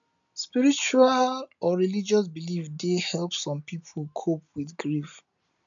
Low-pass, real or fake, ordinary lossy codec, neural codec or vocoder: 7.2 kHz; real; none; none